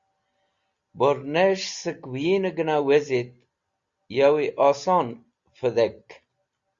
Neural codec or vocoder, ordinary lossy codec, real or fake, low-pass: none; Opus, 64 kbps; real; 7.2 kHz